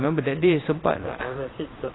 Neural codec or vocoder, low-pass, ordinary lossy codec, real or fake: vocoder, 22.05 kHz, 80 mel bands, Vocos; 7.2 kHz; AAC, 16 kbps; fake